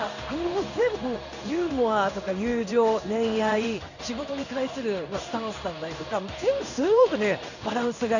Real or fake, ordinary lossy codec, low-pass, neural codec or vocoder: fake; none; 7.2 kHz; codec, 16 kHz in and 24 kHz out, 1 kbps, XY-Tokenizer